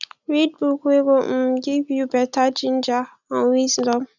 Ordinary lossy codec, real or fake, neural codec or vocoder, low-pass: none; real; none; 7.2 kHz